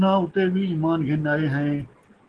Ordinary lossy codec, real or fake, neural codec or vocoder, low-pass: Opus, 16 kbps; real; none; 10.8 kHz